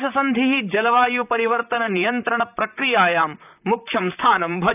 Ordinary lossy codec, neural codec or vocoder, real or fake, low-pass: none; vocoder, 44.1 kHz, 128 mel bands, Pupu-Vocoder; fake; 3.6 kHz